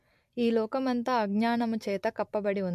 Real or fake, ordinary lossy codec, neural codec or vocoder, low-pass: real; MP3, 64 kbps; none; 14.4 kHz